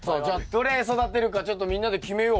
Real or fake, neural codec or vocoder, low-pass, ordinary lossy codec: real; none; none; none